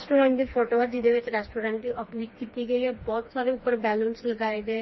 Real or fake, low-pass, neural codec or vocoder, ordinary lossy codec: fake; 7.2 kHz; codec, 16 kHz, 2 kbps, FreqCodec, smaller model; MP3, 24 kbps